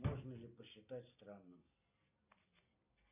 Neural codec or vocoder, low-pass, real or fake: none; 3.6 kHz; real